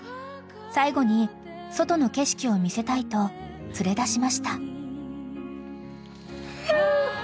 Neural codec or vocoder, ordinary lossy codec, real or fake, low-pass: none; none; real; none